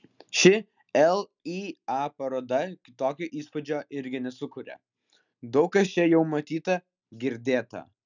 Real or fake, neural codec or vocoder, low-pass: real; none; 7.2 kHz